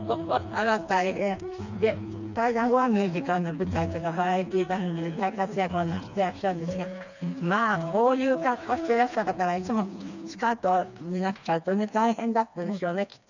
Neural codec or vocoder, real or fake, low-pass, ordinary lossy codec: codec, 16 kHz, 2 kbps, FreqCodec, smaller model; fake; 7.2 kHz; none